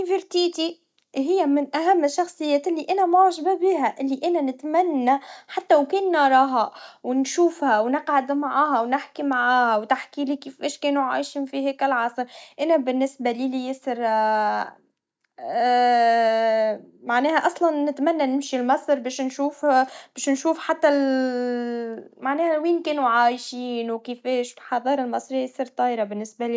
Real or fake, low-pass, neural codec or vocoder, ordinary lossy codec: real; none; none; none